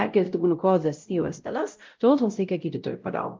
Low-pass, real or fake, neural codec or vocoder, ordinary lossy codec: 7.2 kHz; fake; codec, 16 kHz, 0.5 kbps, X-Codec, WavLM features, trained on Multilingual LibriSpeech; Opus, 32 kbps